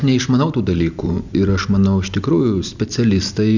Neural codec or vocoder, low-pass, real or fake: none; 7.2 kHz; real